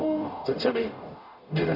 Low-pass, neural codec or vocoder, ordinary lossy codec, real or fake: 5.4 kHz; codec, 44.1 kHz, 0.9 kbps, DAC; none; fake